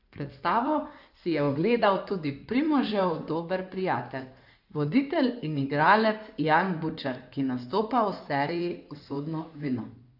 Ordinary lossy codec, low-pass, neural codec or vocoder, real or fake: none; 5.4 kHz; codec, 16 kHz in and 24 kHz out, 2.2 kbps, FireRedTTS-2 codec; fake